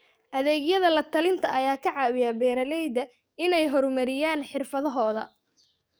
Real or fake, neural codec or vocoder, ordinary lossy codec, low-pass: fake; codec, 44.1 kHz, 7.8 kbps, DAC; none; none